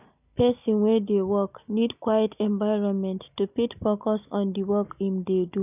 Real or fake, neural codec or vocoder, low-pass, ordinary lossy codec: real; none; 3.6 kHz; none